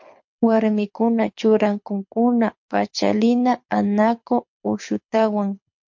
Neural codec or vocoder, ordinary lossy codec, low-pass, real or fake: codec, 24 kHz, 6 kbps, HILCodec; MP3, 48 kbps; 7.2 kHz; fake